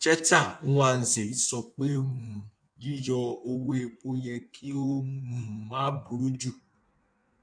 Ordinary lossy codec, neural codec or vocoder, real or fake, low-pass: none; codec, 16 kHz in and 24 kHz out, 1.1 kbps, FireRedTTS-2 codec; fake; 9.9 kHz